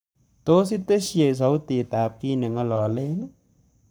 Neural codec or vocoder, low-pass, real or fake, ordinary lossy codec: codec, 44.1 kHz, 7.8 kbps, Pupu-Codec; none; fake; none